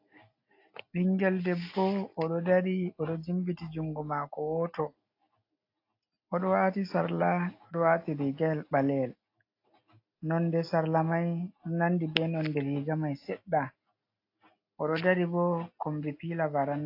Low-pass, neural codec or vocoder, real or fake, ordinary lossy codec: 5.4 kHz; none; real; AAC, 32 kbps